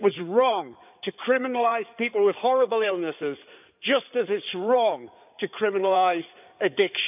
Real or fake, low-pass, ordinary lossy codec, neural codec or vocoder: fake; 3.6 kHz; none; codec, 16 kHz in and 24 kHz out, 2.2 kbps, FireRedTTS-2 codec